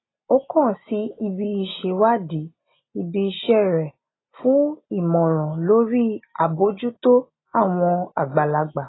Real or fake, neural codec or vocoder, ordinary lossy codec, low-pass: real; none; AAC, 16 kbps; 7.2 kHz